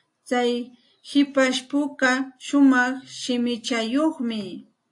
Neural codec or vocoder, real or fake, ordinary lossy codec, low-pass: none; real; AAC, 48 kbps; 10.8 kHz